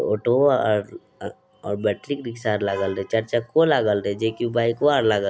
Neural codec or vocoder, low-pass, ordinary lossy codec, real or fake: none; none; none; real